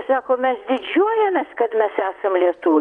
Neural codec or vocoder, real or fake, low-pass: vocoder, 22.05 kHz, 80 mel bands, WaveNeXt; fake; 9.9 kHz